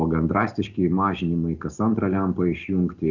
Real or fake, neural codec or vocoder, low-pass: real; none; 7.2 kHz